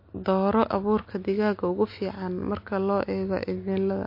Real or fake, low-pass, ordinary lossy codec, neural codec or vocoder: real; 5.4 kHz; MP3, 32 kbps; none